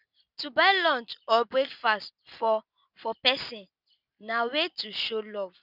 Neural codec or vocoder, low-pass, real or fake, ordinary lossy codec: none; 5.4 kHz; real; none